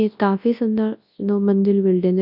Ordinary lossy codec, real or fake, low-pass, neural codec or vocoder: none; fake; 5.4 kHz; codec, 24 kHz, 0.9 kbps, WavTokenizer, large speech release